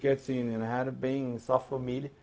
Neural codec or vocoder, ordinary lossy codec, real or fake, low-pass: codec, 16 kHz, 0.4 kbps, LongCat-Audio-Codec; none; fake; none